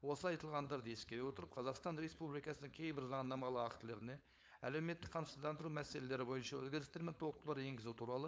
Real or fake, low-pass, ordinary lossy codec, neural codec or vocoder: fake; none; none; codec, 16 kHz, 4.8 kbps, FACodec